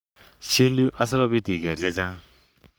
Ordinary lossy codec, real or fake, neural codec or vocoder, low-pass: none; fake; codec, 44.1 kHz, 3.4 kbps, Pupu-Codec; none